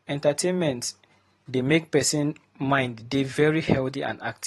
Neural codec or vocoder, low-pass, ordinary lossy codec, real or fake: vocoder, 44.1 kHz, 128 mel bands every 512 samples, BigVGAN v2; 19.8 kHz; AAC, 32 kbps; fake